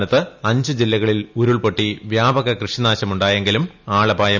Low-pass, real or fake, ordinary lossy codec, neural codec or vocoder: 7.2 kHz; real; none; none